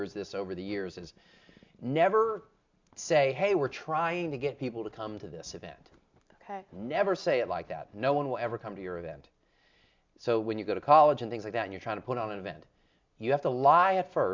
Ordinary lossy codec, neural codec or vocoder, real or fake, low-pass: MP3, 64 kbps; none; real; 7.2 kHz